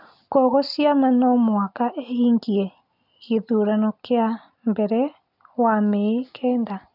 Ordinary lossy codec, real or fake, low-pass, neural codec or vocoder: none; real; 5.4 kHz; none